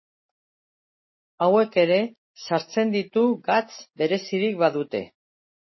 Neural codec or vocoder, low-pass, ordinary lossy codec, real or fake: none; 7.2 kHz; MP3, 24 kbps; real